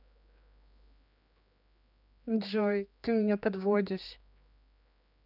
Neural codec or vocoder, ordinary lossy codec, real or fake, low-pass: codec, 16 kHz, 2 kbps, X-Codec, HuBERT features, trained on general audio; none; fake; 5.4 kHz